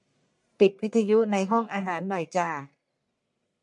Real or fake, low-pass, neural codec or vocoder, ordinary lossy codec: fake; 10.8 kHz; codec, 44.1 kHz, 1.7 kbps, Pupu-Codec; MP3, 64 kbps